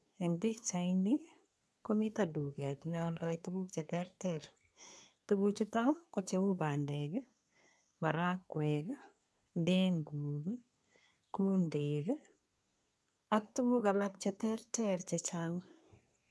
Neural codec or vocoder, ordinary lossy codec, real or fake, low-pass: codec, 24 kHz, 1 kbps, SNAC; none; fake; none